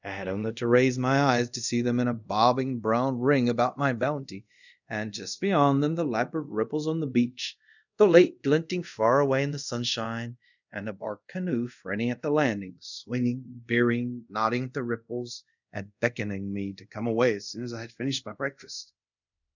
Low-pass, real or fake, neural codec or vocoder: 7.2 kHz; fake; codec, 24 kHz, 0.5 kbps, DualCodec